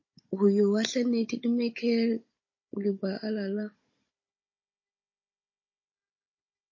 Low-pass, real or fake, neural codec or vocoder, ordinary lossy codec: 7.2 kHz; fake; codec, 16 kHz, 16 kbps, FunCodec, trained on Chinese and English, 50 frames a second; MP3, 32 kbps